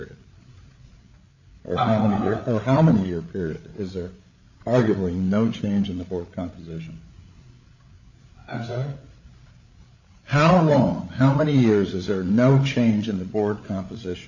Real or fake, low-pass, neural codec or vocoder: fake; 7.2 kHz; codec, 16 kHz, 8 kbps, FreqCodec, larger model